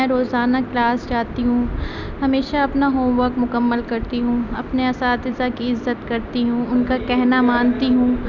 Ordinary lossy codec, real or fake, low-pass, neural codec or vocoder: none; real; 7.2 kHz; none